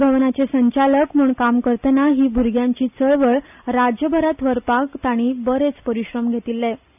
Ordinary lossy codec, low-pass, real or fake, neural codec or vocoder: none; 3.6 kHz; real; none